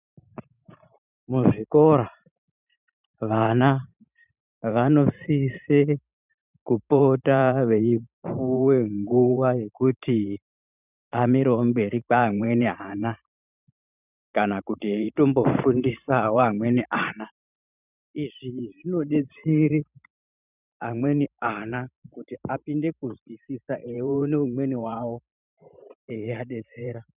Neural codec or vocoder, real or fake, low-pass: vocoder, 44.1 kHz, 128 mel bands every 512 samples, BigVGAN v2; fake; 3.6 kHz